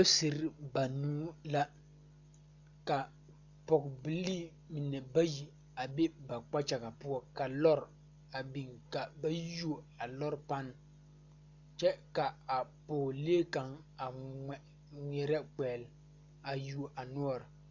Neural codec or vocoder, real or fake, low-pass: none; real; 7.2 kHz